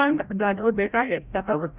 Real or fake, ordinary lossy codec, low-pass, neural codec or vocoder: fake; Opus, 64 kbps; 3.6 kHz; codec, 16 kHz, 0.5 kbps, FreqCodec, larger model